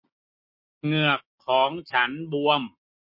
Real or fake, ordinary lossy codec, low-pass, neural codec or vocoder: real; MP3, 32 kbps; 5.4 kHz; none